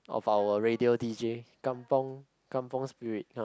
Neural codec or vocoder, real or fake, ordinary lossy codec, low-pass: none; real; none; none